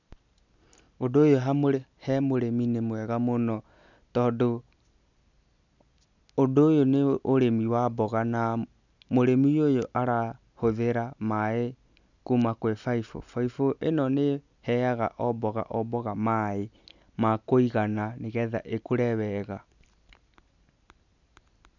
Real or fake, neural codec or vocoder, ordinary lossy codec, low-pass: real; none; none; 7.2 kHz